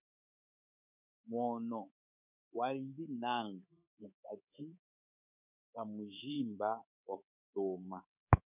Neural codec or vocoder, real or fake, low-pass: codec, 24 kHz, 3.1 kbps, DualCodec; fake; 3.6 kHz